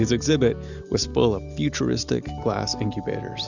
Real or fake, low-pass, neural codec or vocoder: real; 7.2 kHz; none